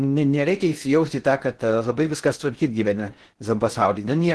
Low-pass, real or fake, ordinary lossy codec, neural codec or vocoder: 10.8 kHz; fake; Opus, 16 kbps; codec, 16 kHz in and 24 kHz out, 0.6 kbps, FocalCodec, streaming, 2048 codes